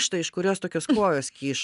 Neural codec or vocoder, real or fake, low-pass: none; real; 10.8 kHz